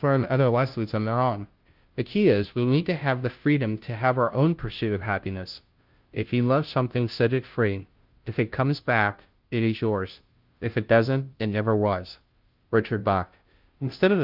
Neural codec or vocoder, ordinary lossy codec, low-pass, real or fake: codec, 16 kHz, 0.5 kbps, FunCodec, trained on Chinese and English, 25 frames a second; Opus, 24 kbps; 5.4 kHz; fake